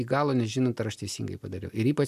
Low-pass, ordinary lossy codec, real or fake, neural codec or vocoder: 14.4 kHz; AAC, 96 kbps; real; none